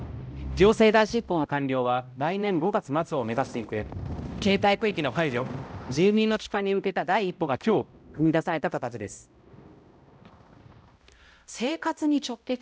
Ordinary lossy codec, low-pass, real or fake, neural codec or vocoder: none; none; fake; codec, 16 kHz, 0.5 kbps, X-Codec, HuBERT features, trained on balanced general audio